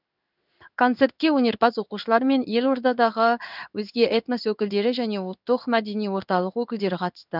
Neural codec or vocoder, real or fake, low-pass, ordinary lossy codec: codec, 16 kHz in and 24 kHz out, 1 kbps, XY-Tokenizer; fake; 5.4 kHz; none